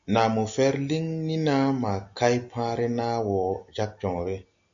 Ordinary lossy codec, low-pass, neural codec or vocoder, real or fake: MP3, 64 kbps; 7.2 kHz; none; real